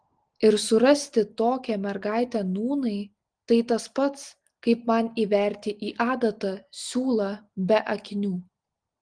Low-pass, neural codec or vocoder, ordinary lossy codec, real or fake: 9.9 kHz; none; Opus, 24 kbps; real